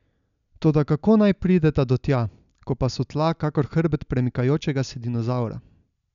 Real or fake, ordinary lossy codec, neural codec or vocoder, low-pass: real; none; none; 7.2 kHz